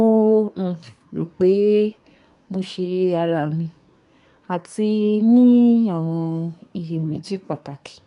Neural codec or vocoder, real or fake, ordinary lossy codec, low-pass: codec, 24 kHz, 1 kbps, SNAC; fake; none; 10.8 kHz